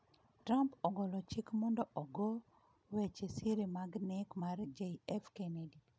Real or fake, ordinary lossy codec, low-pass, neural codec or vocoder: real; none; none; none